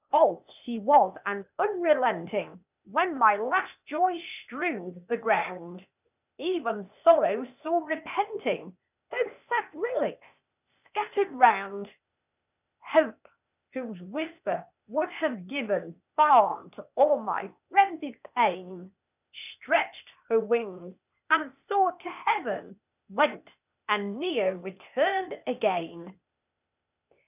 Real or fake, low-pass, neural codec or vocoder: fake; 3.6 kHz; codec, 16 kHz, 0.8 kbps, ZipCodec